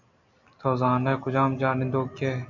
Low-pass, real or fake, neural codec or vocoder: 7.2 kHz; real; none